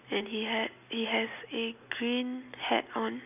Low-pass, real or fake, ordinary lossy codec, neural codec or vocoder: 3.6 kHz; real; none; none